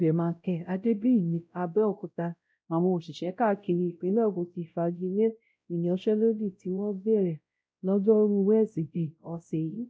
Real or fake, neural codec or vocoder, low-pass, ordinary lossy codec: fake; codec, 16 kHz, 0.5 kbps, X-Codec, WavLM features, trained on Multilingual LibriSpeech; none; none